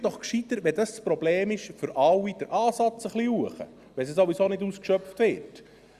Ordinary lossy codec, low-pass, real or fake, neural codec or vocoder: Opus, 64 kbps; 14.4 kHz; real; none